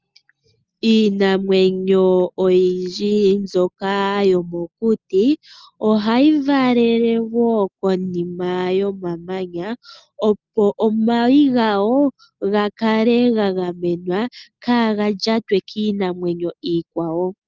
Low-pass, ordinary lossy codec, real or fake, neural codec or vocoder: 7.2 kHz; Opus, 32 kbps; real; none